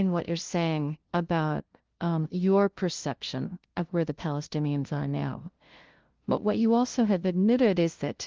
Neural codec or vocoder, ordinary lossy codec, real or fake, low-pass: codec, 16 kHz, 0.5 kbps, FunCodec, trained on LibriTTS, 25 frames a second; Opus, 16 kbps; fake; 7.2 kHz